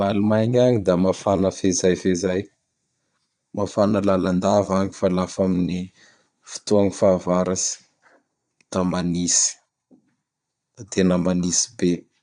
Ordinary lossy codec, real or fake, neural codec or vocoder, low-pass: none; fake; vocoder, 22.05 kHz, 80 mel bands, Vocos; 9.9 kHz